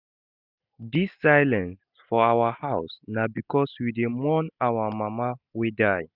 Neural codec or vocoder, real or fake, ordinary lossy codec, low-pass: none; real; none; 5.4 kHz